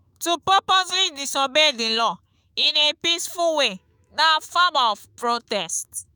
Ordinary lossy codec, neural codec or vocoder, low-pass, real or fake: none; autoencoder, 48 kHz, 128 numbers a frame, DAC-VAE, trained on Japanese speech; none; fake